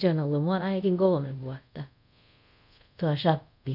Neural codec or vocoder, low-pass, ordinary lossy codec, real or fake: codec, 24 kHz, 0.5 kbps, DualCodec; 5.4 kHz; none; fake